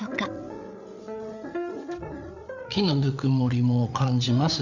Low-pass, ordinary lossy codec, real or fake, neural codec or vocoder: 7.2 kHz; none; fake; codec, 16 kHz, 8 kbps, FreqCodec, larger model